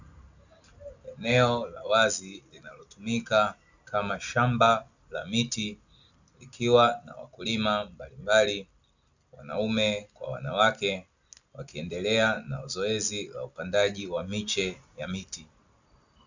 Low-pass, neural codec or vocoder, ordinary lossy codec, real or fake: 7.2 kHz; none; Opus, 64 kbps; real